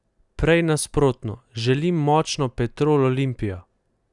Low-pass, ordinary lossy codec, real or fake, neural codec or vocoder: 10.8 kHz; none; real; none